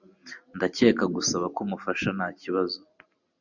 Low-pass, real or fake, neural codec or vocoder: 7.2 kHz; real; none